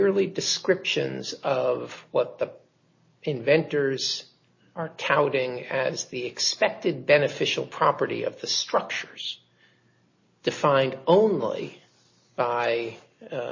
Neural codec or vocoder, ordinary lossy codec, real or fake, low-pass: none; MP3, 32 kbps; real; 7.2 kHz